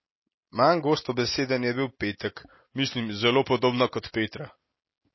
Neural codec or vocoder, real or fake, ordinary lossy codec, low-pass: none; real; MP3, 24 kbps; 7.2 kHz